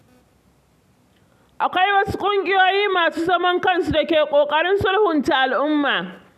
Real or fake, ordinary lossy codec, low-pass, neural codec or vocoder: real; none; 14.4 kHz; none